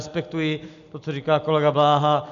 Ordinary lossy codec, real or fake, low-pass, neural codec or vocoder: MP3, 96 kbps; real; 7.2 kHz; none